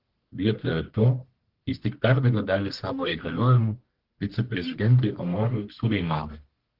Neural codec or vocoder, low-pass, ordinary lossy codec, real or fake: codec, 44.1 kHz, 1.7 kbps, Pupu-Codec; 5.4 kHz; Opus, 16 kbps; fake